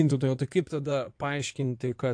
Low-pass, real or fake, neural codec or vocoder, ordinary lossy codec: 9.9 kHz; fake; codec, 16 kHz in and 24 kHz out, 2.2 kbps, FireRedTTS-2 codec; AAC, 64 kbps